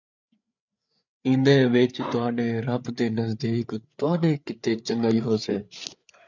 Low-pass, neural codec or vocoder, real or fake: 7.2 kHz; codec, 16 kHz, 8 kbps, FreqCodec, larger model; fake